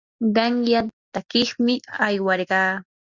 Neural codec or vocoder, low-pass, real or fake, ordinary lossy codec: none; 7.2 kHz; real; Opus, 64 kbps